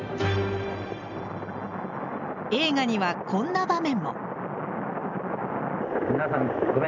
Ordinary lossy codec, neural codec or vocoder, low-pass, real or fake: none; none; 7.2 kHz; real